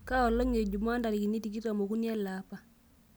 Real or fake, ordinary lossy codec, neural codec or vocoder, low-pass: real; none; none; none